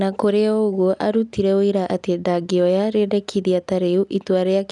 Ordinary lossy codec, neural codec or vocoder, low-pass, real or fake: none; none; 10.8 kHz; real